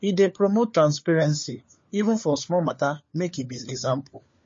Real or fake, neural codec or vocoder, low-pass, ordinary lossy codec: fake; codec, 16 kHz, 4 kbps, FunCodec, trained on LibriTTS, 50 frames a second; 7.2 kHz; MP3, 32 kbps